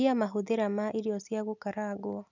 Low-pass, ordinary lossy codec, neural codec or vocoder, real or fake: 7.2 kHz; none; none; real